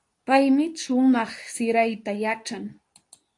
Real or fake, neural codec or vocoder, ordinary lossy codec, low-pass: fake; codec, 24 kHz, 0.9 kbps, WavTokenizer, medium speech release version 2; AAC, 48 kbps; 10.8 kHz